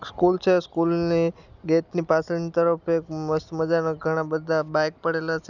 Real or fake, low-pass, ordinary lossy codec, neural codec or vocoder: real; 7.2 kHz; none; none